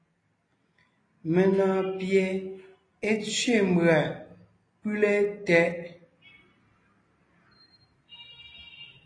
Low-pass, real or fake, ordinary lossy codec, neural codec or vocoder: 9.9 kHz; real; AAC, 32 kbps; none